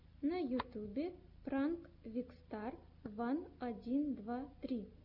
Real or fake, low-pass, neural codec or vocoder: real; 5.4 kHz; none